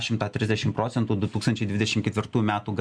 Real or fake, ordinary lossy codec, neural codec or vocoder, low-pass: real; Opus, 64 kbps; none; 9.9 kHz